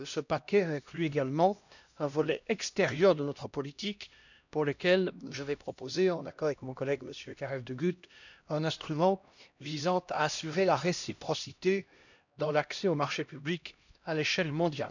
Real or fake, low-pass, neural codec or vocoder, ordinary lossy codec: fake; 7.2 kHz; codec, 16 kHz, 1 kbps, X-Codec, HuBERT features, trained on LibriSpeech; none